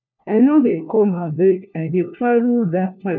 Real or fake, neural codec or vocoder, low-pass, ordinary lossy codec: fake; codec, 16 kHz, 1 kbps, FunCodec, trained on LibriTTS, 50 frames a second; 7.2 kHz; none